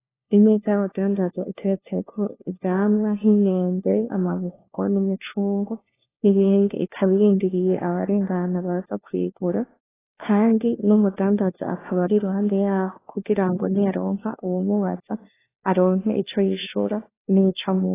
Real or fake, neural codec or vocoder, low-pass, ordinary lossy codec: fake; codec, 16 kHz, 1 kbps, FunCodec, trained on LibriTTS, 50 frames a second; 3.6 kHz; AAC, 16 kbps